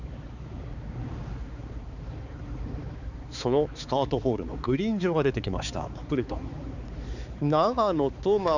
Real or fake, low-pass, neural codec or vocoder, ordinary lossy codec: fake; 7.2 kHz; codec, 16 kHz, 4 kbps, X-Codec, HuBERT features, trained on balanced general audio; none